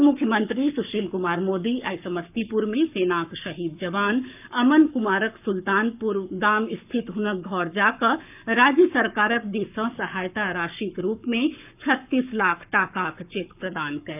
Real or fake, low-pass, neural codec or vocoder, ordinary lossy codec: fake; 3.6 kHz; codec, 44.1 kHz, 7.8 kbps, Pupu-Codec; none